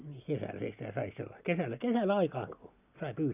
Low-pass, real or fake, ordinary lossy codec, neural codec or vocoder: 3.6 kHz; real; none; none